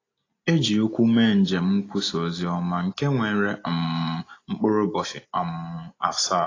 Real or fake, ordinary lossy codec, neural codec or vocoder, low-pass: real; AAC, 32 kbps; none; 7.2 kHz